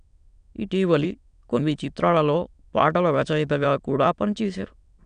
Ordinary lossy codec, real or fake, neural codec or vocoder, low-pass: none; fake; autoencoder, 22.05 kHz, a latent of 192 numbers a frame, VITS, trained on many speakers; 9.9 kHz